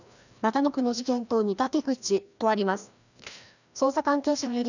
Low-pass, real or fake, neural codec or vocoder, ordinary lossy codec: 7.2 kHz; fake; codec, 16 kHz, 1 kbps, FreqCodec, larger model; none